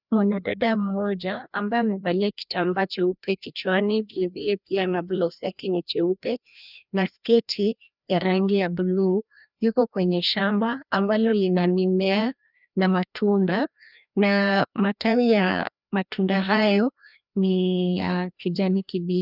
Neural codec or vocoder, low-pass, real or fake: codec, 16 kHz, 1 kbps, FreqCodec, larger model; 5.4 kHz; fake